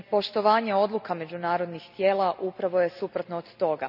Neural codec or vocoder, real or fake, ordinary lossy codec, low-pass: none; real; none; 5.4 kHz